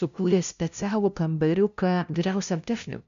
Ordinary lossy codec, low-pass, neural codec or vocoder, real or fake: AAC, 96 kbps; 7.2 kHz; codec, 16 kHz, 0.5 kbps, FunCodec, trained on LibriTTS, 25 frames a second; fake